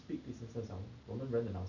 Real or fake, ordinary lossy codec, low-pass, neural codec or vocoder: real; none; 7.2 kHz; none